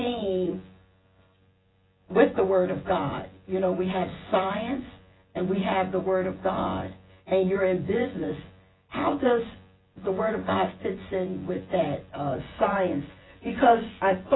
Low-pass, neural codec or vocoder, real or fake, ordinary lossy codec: 7.2 kHz; vocoder, 24 kHz, 100 mel bands, Vocos; fake; AAC, 16 kbps